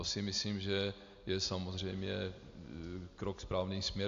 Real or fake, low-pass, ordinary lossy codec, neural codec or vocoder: real; 7.2 kHz; AAC, 64 kbps; none